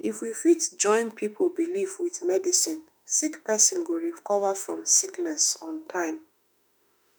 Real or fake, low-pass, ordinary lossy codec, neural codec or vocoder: fake; none; none; autoencoder, 48 kHz, 32 numbers a frame, DAC-VAE, trained on Japanese speech